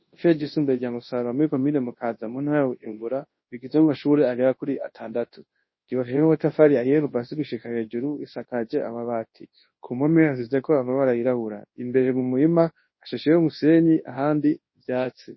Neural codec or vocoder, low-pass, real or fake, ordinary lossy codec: codec, 24 kHz, 0.9 kbps, WavTokenizer, large speech release; 7.2 kHz; fake; MP3, 24 kbps